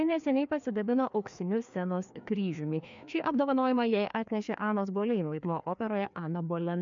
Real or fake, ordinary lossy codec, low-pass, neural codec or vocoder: fake; MP3, 64 kbps; 7.2 kHz; codec, 16 kHz, 2 kbps, FreqCodec, larger model